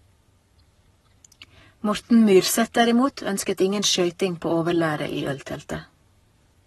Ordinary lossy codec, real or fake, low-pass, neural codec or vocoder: AAC, 32 kbps; fake; 19.8 kHz; codec, 44.1 kHz, 7.8 kbps, Pupu-Codec